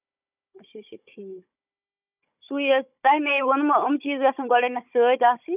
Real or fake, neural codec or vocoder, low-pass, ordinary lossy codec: fake; codec, 16 kHz, 16 kbps, FunCodec, trained on Chinese and English, 50 frames a second; 3.6 kHz; none